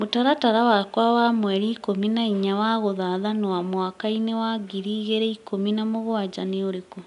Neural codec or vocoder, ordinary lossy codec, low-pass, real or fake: none; none; 10.8 kHz; real